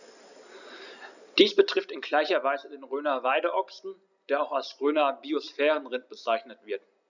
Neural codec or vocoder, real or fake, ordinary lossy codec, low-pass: none; real; none; 7.2 kHz